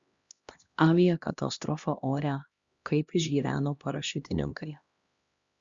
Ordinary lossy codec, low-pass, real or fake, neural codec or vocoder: Opus, 64 kbps; 7.2 kHz; fake; codec, 16 kHz, 1 kbps, X-Codec, HuBERT features, trained on LibriSpeech